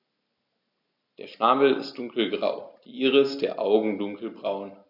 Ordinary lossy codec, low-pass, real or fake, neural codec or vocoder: none; 5.4 kHz; real; none